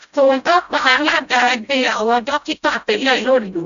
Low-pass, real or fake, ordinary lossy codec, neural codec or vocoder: 7.2 kHz; fake; AAC, 96 kbps; codec, 16 kHz, 0.5 kbps, FreqCodec, smaller model